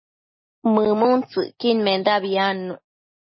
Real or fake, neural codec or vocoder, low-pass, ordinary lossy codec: real; none; 7.2 kHz; MP3, 24 kbps